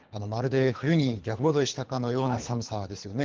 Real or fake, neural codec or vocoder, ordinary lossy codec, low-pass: fake; codec, 24 kHz, 3 kbps, HILCodec; Opus, 16 kbps; 7.2 kHz